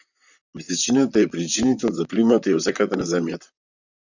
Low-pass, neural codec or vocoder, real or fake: 7.2 kHz; vocoder, 44.1 kHz, 128 mel bands, Pupu-Vocoder; fake